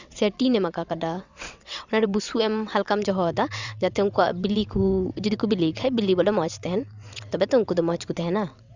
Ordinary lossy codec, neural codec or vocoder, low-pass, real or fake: Opus, 64 kbps; vocoder, 22.05 kHz, 80 mel bands, WaveNeXt; 7.2 kHz; fake